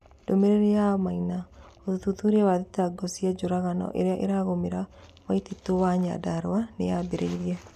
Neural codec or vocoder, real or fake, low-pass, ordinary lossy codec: none; real; 14.4 kHz; none